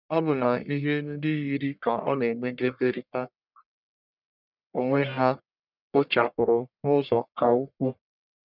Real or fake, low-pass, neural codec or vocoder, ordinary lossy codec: fake; 5.4 kHz; codec, 44.1 kHz, 1.7 kbps, Pupu-Codec; none